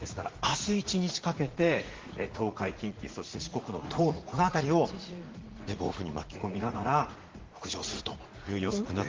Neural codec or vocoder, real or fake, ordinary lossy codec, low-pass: vocoder, 44.1 kHz, 80 mel bands, Vocos; fake; Opus, 16 kbps; 7.2 kHz